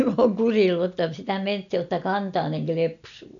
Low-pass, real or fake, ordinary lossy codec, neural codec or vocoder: 7.2 kHz; real; none; none